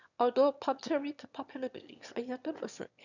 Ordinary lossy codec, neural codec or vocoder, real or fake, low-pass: none; autoencoder, 22.05 kHz, a latent of 192 numbers a frame, VITS, trained on one speaker; fake; 7.2 kHz